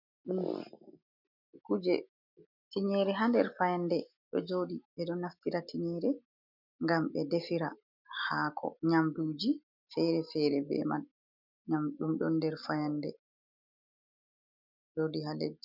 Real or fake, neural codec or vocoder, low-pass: real; none; 5.4 kHz